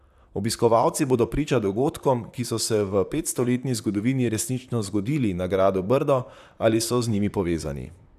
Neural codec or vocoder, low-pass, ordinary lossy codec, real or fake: vocoder, 44.1 kHz, 128 mel bands, Pupu-Vocoder; 14.4 kHz; none; fake